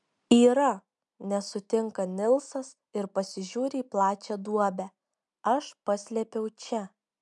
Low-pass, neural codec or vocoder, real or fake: 10.8 kHz; none; real